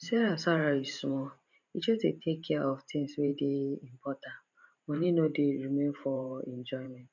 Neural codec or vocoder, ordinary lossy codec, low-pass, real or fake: vocoder, 44.1 kHz, 128 mel bands every 512 samples, BigVGAN v2; none; 7.2 kHz; fake